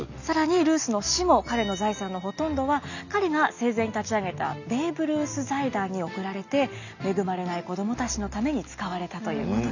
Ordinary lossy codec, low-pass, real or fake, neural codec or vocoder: none; 7.2 kHz; real; none